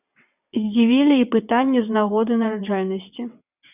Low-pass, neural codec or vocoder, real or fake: 3.6 kHz; vocoder, 22.05 kHz, 80 mel bands, WaveNeXt; fake